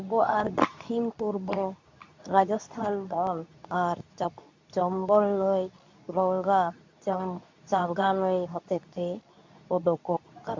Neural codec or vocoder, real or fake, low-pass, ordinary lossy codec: codec, 24 kHz, 0.9 kbps, WavTokenizer, medium speech release version 2; fake; 7.2 kHz; MP3, 64 kbps